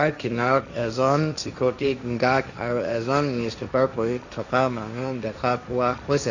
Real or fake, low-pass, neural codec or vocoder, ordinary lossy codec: fake; 7.2 kHz; codec, 16 kHz, 1.1 kbps, Voila-Tokenizer; none